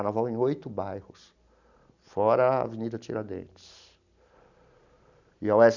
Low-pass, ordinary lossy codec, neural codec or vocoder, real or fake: 7.2 kHz; none; none; real